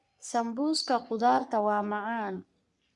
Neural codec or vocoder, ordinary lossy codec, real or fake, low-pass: codec, 44.1 kHz, 3.4 kbps, Pupu-Codec; Opus, 64 kbps; fake; 10.8 kHz